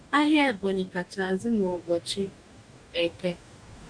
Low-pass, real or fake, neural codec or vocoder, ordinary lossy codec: 9.9 kHz; fake; codec, 44.1 kHz, 2.6 kbps, DAC; none